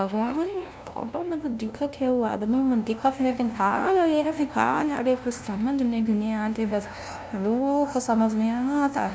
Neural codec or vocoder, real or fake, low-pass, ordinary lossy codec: codec, 16 kHz, 0.5 kbps, FunCodec, trained on LibriTTS, 25 frames a second; fake; none; none